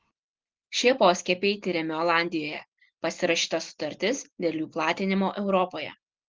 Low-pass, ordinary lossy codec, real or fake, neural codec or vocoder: 7.2 kHz; Opus, 16 kbps; real; none